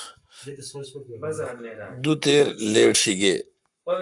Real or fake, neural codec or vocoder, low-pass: fake; codec, 44.1 kHz, 7.8 kbps, Pupu-Codec; 10.8 kHz